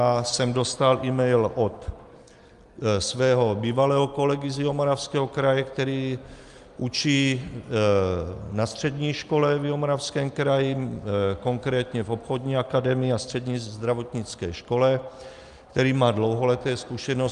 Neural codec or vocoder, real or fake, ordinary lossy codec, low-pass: none; real; Opus, 32 kbps; 10.8 kHz